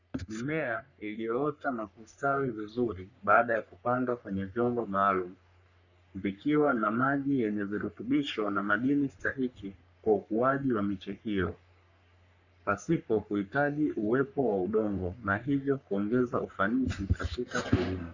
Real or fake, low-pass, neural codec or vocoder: fake; 7.2 kHz; codec, 44.1 kHz, 3.4 kbps, Pupu-Codec